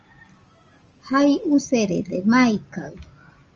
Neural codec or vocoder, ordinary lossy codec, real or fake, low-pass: none; Opus, 24 kbps; real; 7.2 kHz